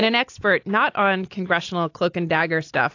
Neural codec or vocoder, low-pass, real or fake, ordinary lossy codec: none; 7.2 kHz; real; AAC, 48 kbps